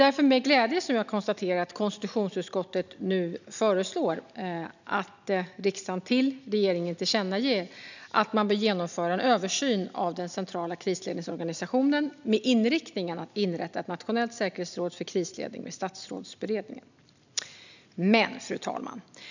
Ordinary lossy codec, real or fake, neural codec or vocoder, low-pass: none; real; none; 7.2 kHz